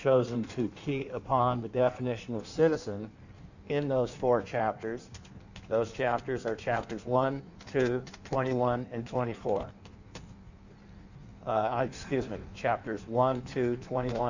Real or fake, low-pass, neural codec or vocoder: fake; 7.2 kHz; codec, 16 kHz in and 24 kHz out, 1.1 kbps, FireRedTTS-2 codec